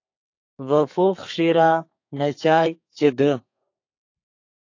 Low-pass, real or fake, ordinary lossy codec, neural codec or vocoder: 7.2 kHz; fake; AAC, 48 kbps; codec, 32 kHz, 1.9 kbps, SNAC